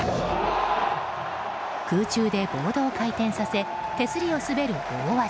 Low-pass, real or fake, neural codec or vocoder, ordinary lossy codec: none; real; none; none